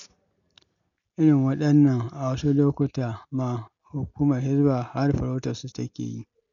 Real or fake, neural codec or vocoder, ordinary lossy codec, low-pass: real; none; none; 7.2 kHz